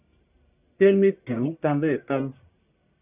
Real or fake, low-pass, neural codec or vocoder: fake; 3.6 kHz; codec, 44.1 kHz, 1.7 kbps, Pupu-Codec